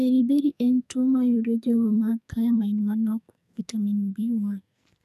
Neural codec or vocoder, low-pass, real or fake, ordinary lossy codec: codec, 44.1 kHz, 2.6 kbps, SNAC; 14.4 kHz; fake; none